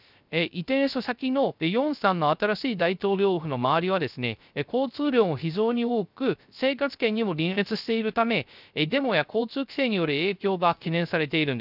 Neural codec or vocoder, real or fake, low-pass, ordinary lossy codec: codec, 16 kHz, 0.3 kbps, FocalCodec; fake; 5.4 kHz; none